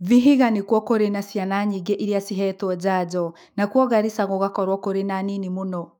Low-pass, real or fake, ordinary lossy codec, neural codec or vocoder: 19.8 kHz; fake; none; autoencoder, 48 kHz, 128 numbers a frame, DAC-VAE, trained on Japanese speech